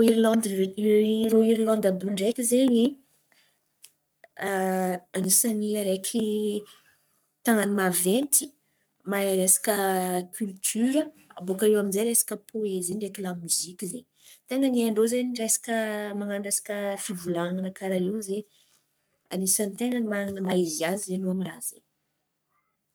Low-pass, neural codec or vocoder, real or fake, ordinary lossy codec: none; codec, 44.1 kHz, 3.4 kbps, Pupu-Codec; fake; none